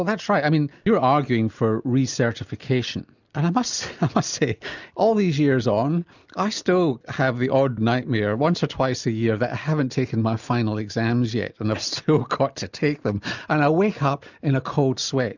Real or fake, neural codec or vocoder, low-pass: real; none; 7.2 kHz